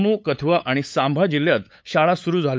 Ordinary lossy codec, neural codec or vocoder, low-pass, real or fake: none; codec, 16 kHz, 8 kbps, FunCodec, trained on LibriTTS, 25 frames a second; none; fake